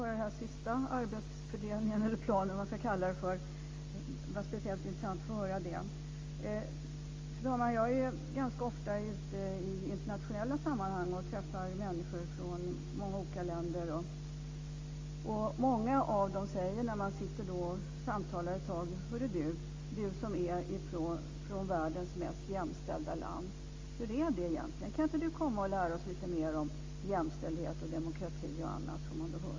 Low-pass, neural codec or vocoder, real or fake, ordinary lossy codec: 7.2 kHz; none; real; Opus, 32 kbps